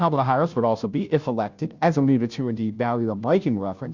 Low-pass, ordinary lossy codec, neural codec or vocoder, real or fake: 7.2 kHz; Opus, 64 kbps; codec, 16 kHz, 0.5 kbps, FunCodec, trained on Chinese and English, 25 frames a second; fake